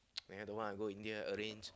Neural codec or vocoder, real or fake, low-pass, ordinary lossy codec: none; real; none; none